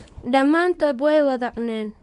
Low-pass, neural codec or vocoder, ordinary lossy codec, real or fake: 10.8 kHz; codec, 24 kHz, 0.9 kbps, WavTokenizer, small release; MP3, 64 kbps; fake